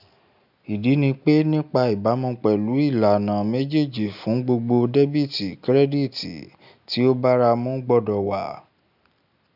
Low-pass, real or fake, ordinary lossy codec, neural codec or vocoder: 5.4 kHz; real; none; none